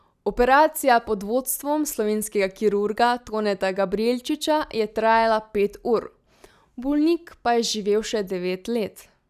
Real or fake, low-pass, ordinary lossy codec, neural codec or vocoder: real; 14.4 kHz; none; none